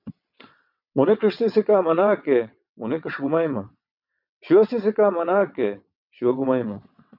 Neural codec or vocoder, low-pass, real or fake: vocoder, 22.05 kHz, 80 mel bands, WaveNeXt; 5.4 kHz; fake